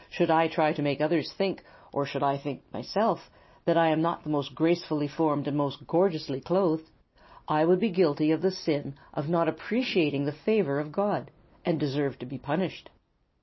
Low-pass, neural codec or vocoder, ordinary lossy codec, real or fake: 7.2 kHz; none; MP3, 24 kbps; real